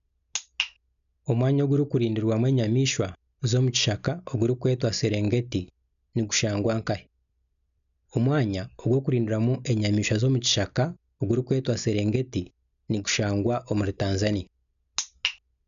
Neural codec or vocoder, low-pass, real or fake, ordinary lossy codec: none; 7.2 kHz; real; none